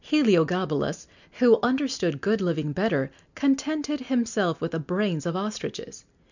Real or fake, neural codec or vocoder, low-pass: real; none; 7.2 kHz